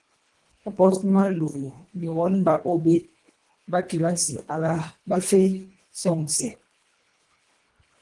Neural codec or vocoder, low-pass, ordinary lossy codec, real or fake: codec, 24 kHz, 1.5 kbps, HILCodec; 10.8 kHz; Opus, 24 kbps; fake